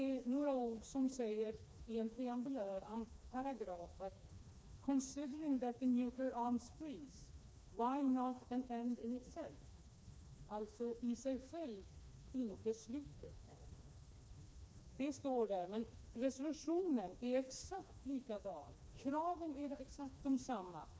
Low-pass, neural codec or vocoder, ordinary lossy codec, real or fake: none; codec, 16 kHz, 2 kbps, FreqCodec, smaller model; none; fake